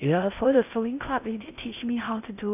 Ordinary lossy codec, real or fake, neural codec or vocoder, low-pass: none; fake; codec, 16 kHz in and 24 kHz out, 0.6 kbps, FocalCodec, streaming, 4096 codes; 3.6 kHz